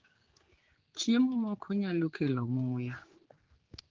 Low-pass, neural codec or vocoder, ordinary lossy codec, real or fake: 7.2 kHz; codec, 16 kHz, 4 kbps, X-Codec, HuBERT features, trained on general audio; Opus, 16 kbps; fake